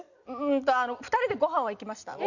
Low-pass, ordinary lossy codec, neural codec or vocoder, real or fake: 7.2 kHz; none; none; real